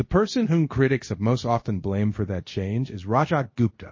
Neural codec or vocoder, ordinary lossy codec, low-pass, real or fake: codec, 24 kHz, 0.5 kbps, DualCodec; MP3, 32 kbps; 7.2 kHz; fake